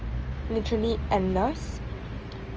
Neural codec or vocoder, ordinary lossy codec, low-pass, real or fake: none; Opus, 24 kbps; 7.2 kHz; real